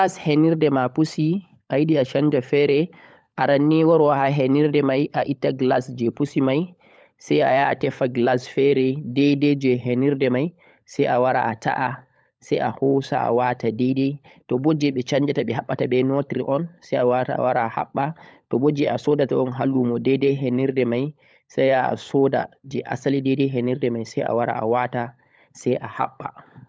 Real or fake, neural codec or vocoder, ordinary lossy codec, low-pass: fake; codec, 16 kHz, 16 kbps, FunCodec, trained on LibriTTS, 50 frames a second; none; none